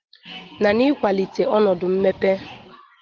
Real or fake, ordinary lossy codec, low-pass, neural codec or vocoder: real; Opus, 16 kbps; 7.2 kHz; none